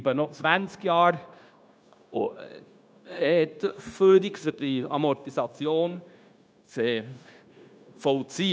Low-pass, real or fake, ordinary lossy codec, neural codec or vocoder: none; fake; none; codec, 16 kHz, 0.9 kbps, LongCat-Audio-Codec